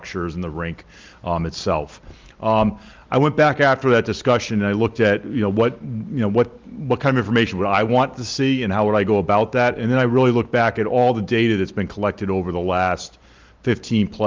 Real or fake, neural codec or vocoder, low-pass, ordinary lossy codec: real; none; 7.2 kHz; Opus, 24 kbps